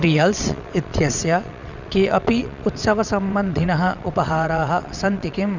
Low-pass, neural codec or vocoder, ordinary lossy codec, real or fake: 7.2 kHz; vocoder, 22.05 kHz, 80 mel bands, WaveNeXt; none; fake